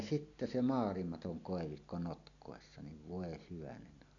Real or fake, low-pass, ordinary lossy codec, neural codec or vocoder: real; 7.2 kHz; none; none